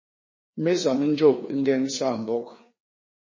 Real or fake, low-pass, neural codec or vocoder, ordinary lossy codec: fake; 7.2 kHz; codec, 16 kHz, 2 kbps, FreqCodec, larger model; MP3, 32 kbps